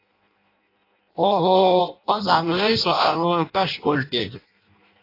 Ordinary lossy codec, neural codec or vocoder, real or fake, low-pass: AAC, 32 kbps; codec, 16 kHz in and 24 kHz out, 0.6 kbps, FireRedTTS-2 codec; fake; 5.4 kHz